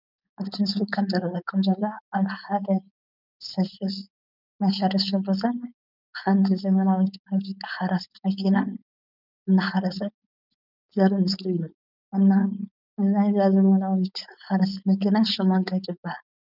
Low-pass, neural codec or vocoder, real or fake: 5.4 kHz; codec, 16 kHz, 4.8 kbps, FACodec; fake